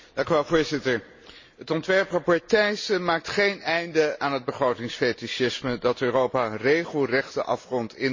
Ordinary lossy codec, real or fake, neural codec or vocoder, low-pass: MP3, 32 kbps; real; none; 7.2 kHz